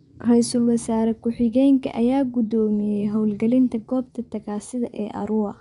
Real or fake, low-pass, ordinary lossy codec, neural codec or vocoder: fake; 10.8 kHz; none; vocoder, 24 kHz, 100 mel bands, Vocos